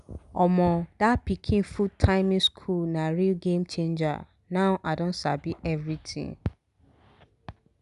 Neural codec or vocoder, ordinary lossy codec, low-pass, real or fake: none; none; 10.8 kHz; real